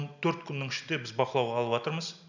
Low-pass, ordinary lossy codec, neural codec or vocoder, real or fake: 7.2 kHz; none; none; real